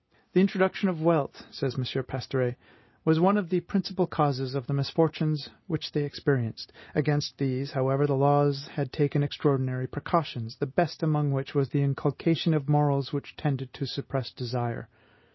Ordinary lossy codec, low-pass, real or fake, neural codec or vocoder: MP3, 24 kbps; 7.2 kHz; real; none